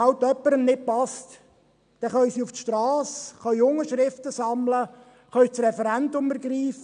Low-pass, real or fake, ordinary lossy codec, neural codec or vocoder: 9.9 kHz; real; none; none